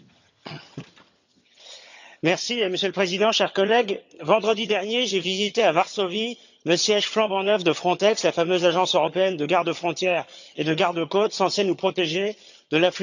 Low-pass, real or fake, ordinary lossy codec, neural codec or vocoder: 7.2 kHz; fake; none; vocoder, 22.05 kHz, 80 mel bands, HiFi-GAN